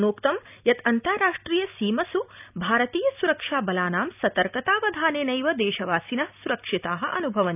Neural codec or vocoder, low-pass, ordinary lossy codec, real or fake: none; 3.6 kHz; none; real